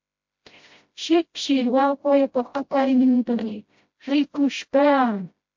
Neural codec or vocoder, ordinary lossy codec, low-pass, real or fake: codec, 16 kHz, 0.5 kbps, FreqCodec, smaller model; MP3, 48 kbps; 7.2 kHz; fake